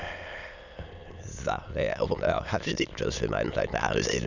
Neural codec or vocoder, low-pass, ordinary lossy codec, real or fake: autoencoder, 22.05 kHz, a latent of 192 numbers a frame, VITS, trained on many speakers; 7.2 kHz; none; fake